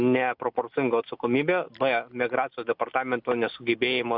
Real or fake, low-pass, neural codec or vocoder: real; 5.4 kHz; none